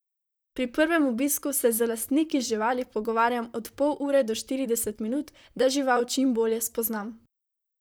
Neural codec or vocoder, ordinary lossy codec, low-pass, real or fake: vocoder, 44.1 kHz, 128 mel bands, Pupu-Vocoder; none; none; fake